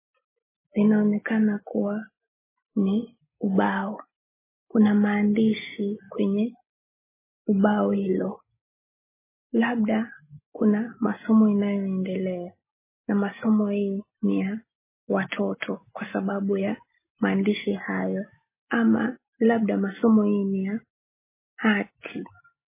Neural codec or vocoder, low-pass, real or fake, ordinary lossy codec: none; 3.6 kHz; real; MP3, 16 kbps